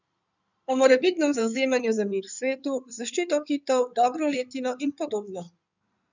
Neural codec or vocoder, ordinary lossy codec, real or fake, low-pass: codec, 16 kHz in and 24 kHz out, 2.2 kbps, FireRedTTS-2 codec; none; fake; 7.2 kHz